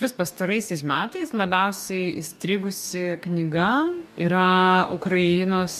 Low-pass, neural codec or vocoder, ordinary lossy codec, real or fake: 14.4 kHz; codec, 44.1 kHz, 2.6 kbps, DAC; MP3, 96 kbps; fake